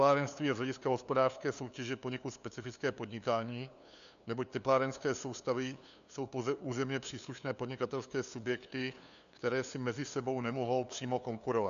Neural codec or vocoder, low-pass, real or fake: codec, 16 kHz, 2 kbps, FunCodec, trained on LibriTTS, 25 frames a second; 7.2 kHz; fake